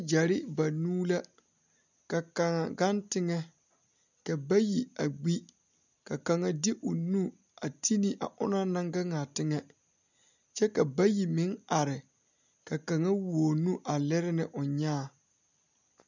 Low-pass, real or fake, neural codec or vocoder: 7.2 kHz; real; none